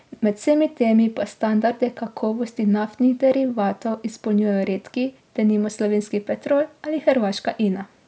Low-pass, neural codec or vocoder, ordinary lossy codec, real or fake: none; none; none; real